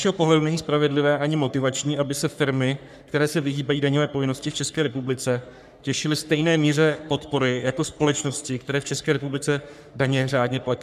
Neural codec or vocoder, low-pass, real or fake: codec, 44.1 kHz, 3.4 kbps, Pupu-Codec; 14.4 kHz; fake